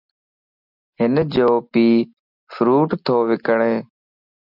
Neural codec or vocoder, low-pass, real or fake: none; 5.4 kHz; real